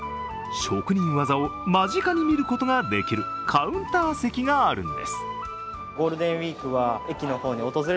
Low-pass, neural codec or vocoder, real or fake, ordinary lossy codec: none; none; real; none